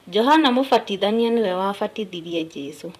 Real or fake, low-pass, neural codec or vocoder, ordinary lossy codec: fake; 14.4 kHz; vocoder, 44.1 kHz, 128 mel bands, Pupu-Vocoder; none